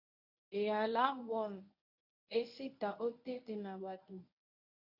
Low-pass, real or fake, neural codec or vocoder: 5.4 kHz; fake; codec, 24 kHz, 0.9 kbps, WavTokenizer, medium speech release version 1